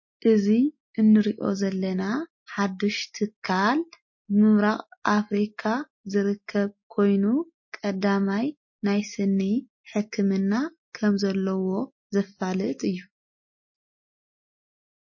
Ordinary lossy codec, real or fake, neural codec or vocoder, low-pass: MP3, 32 kbps; real; none; 7.2 kHz